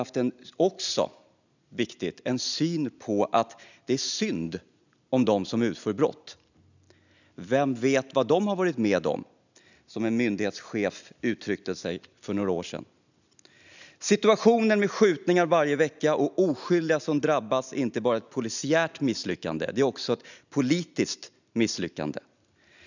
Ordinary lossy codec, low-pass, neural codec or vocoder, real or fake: none; 7.2 kHz; none; real